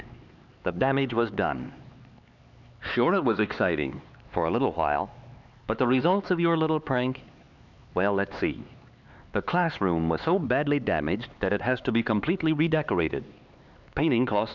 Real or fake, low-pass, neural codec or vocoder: fake; 7.2 kHz; codec, 16 kHz, 4 kbps, X-Codec, HuBERT features, trained on LibriSpeech